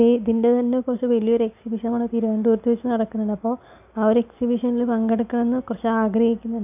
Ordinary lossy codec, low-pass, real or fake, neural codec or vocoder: AAC, 32 kbps; 3.6 kHz; real; none